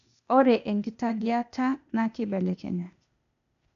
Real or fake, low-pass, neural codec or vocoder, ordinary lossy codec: fake; 7.2 kHz; codec, 16 kHz, 0.8 kbps, ZipCodec; none